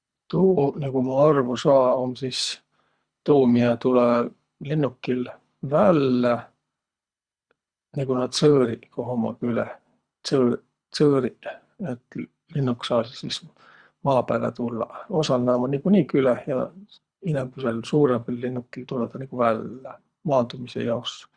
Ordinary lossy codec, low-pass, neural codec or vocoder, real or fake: Opus, 64 kbps; 9.9 kHz; codec, 24 kHz, 3 kbps, HILCodec; fake